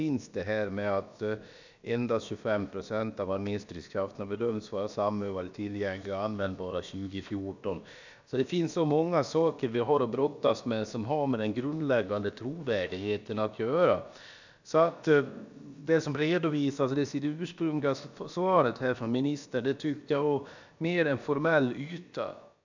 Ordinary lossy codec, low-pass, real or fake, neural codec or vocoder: none; 7.2 kHz; fake; codec, 16 kHz, about 1 kbps, DyCAST, with the encoder's durations